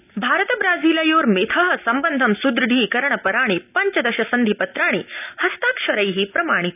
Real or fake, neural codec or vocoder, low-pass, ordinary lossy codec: real; none; 3.6 kHz; none